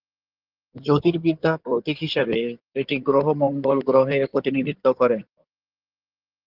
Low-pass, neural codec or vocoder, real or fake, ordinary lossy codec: 5.4 kHz; codec, 16 kHz in and 24 kHz out, 2.2 kbps, FireRedTTS-2 codec; fake; Opus, 32 kbps